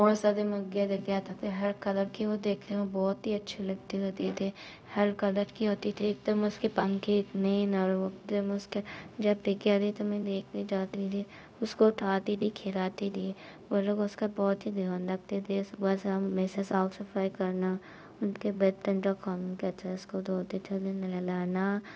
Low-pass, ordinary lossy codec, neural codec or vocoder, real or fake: none; none; codec, 16 kHz, 0.4 kbps, LongCat-Audio-Codec; fake